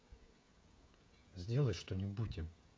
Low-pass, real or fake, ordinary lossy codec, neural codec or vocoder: none; fake; none; codec, 16 kHz, 16 kbps, FreqCodec, smaller model